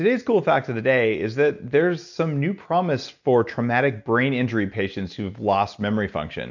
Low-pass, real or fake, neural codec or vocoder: 7.2 kHz; real; none